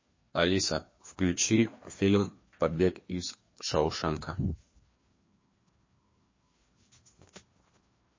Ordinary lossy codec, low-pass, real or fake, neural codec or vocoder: MP3, 32 kbps; 7.2 kHz; fake; codec, 16 kHz, 2 kbps, FreqCodec, larger model